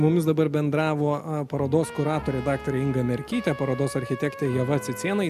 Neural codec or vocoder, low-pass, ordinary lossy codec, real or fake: none; 14.4 kHz; MP3, 96 kbps; real